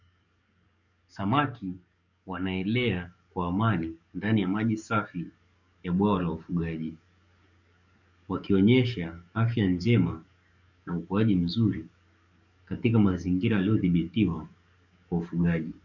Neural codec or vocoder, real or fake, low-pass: codec, 44.1 kHz, 7.8 kbps, DAC; fake; 7.2 kHz